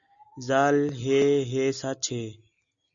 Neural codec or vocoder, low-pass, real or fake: none; 7.2 kHz; real